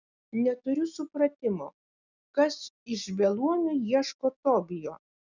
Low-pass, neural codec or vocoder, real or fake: 7.2 kHz; none; real